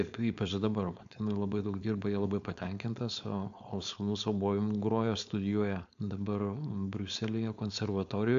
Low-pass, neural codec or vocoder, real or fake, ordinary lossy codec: 7.2 kHz; codec, 16 kHz, 4.8 kbps, FACodec; fake; AAC, 64 kbps